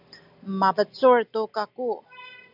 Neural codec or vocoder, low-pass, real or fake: none; 5.4 kHz; real